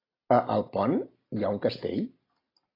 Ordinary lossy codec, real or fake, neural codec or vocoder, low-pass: AAC, 24 kbps; real; none; 5.4 kHz